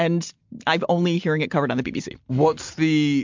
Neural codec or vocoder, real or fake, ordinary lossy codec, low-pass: none; real; MP3, 64 kbps; 7.2 kHz